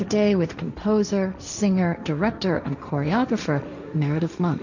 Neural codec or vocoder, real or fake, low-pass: codec, 16 kHz, 1.1 kbps, Voila-Tokenizer; fake; 7.2 kHz